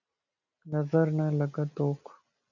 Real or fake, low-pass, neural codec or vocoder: real; 7.2 kHz; none